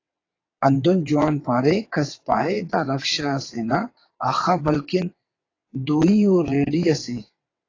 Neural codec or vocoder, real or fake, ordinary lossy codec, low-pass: vocoder, 22.05 kHz, 80 mel bands, WaveNeXt; fake; AAC, 32 kbps; 7.2 kHz